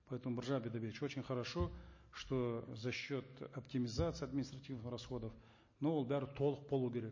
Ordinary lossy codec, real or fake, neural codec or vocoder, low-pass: MP3, 32 kbps; real; none; 7.2 kHz